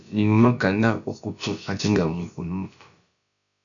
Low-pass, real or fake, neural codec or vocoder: 7.2 kHz; fake; codec, 16 kHz, about 1 kbps, DyCAST, with the encoder's durations